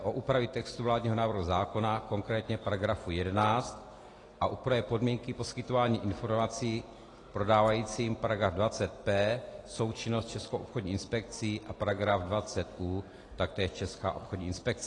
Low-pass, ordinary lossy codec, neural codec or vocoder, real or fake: 10.8 kHz; AAC, 32 kbps; none; real